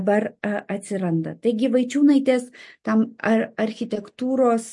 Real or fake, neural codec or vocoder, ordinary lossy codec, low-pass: real; none; MP3, 48 kbps; 10.8 kHz